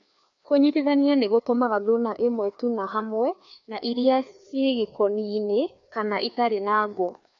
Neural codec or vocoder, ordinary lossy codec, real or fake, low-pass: codec, 16 kHz, 2 kbps, FreqCodec, larger model; MP3, 64 kbps; fake; 7.2 kHz